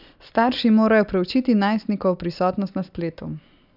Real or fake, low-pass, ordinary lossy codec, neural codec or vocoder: real; 5.4 kHz; none; none